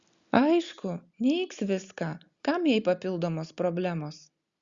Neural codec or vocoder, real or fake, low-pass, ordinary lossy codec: none; real; 7.2 kHz; Opus, 64 kbps